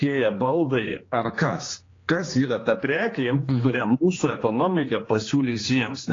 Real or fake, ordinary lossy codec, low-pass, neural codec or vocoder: fake; AAC, 32 kbps; 7.2 kHz; codec, 16 kHz, 2 kbps, X-Codec, HuBERT features, trained on general audio